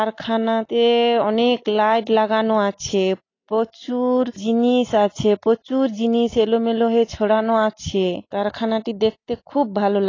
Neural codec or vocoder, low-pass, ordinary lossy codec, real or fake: none; 7.2 kHz; AAC, 32 kbps; real